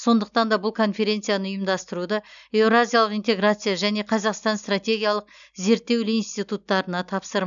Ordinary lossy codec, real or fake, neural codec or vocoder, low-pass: none; real; none; 7.2 kHz